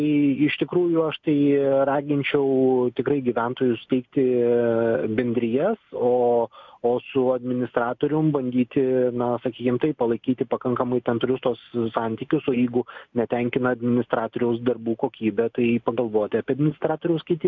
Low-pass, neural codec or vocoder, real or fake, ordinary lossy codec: 7.2 kHz; none; real; MP3, 64 kbps